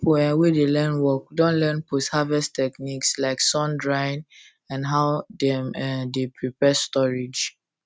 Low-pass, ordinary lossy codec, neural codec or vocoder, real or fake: none; none; none; real